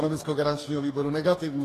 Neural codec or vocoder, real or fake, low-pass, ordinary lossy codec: codec, 44.1 kHz, 2.6 kbps, DAC; fake; 14.4 kHz; AAC, 48 kbps